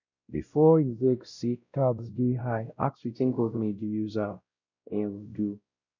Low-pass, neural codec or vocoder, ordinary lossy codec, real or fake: 7.2 kHz; codec, 16 kHz, 0.5 kbps, X-Codec, WavLM features, trained on Multilingual LibriSpeech; none; fake